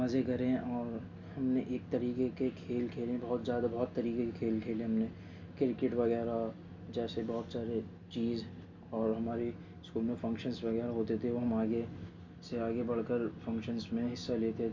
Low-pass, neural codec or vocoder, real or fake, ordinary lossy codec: 7.2 kHz; none; real; MP3, 48 kbps